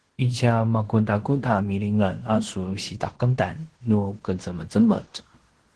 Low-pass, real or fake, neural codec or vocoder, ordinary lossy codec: 10.8 kHz; fake; codec, 16 kHz in and 24 kHz out, 0.9 kbps, LongCat-Audio-Codec, fine tuned four codebook decoder; Opus, 16 kbps